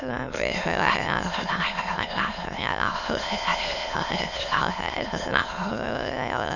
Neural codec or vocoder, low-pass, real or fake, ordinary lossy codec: autoencoder, 22.05 kHz, a latent of 192 numbers a frame, VITS, trained on many speakers; 7.2 kHz; fake; none